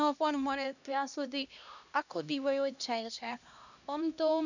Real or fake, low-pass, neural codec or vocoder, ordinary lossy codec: fake; 7.2 kHz; codec, 16 kHz, 1 kbps, X-Codec, HuBERT features, trained on LibriSpeech; none